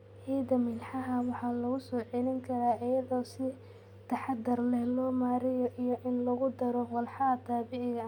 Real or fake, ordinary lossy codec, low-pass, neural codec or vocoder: real; none; 19.8 kHz; none